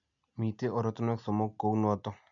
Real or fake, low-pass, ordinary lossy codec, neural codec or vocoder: real; 7.2 kHz; none; none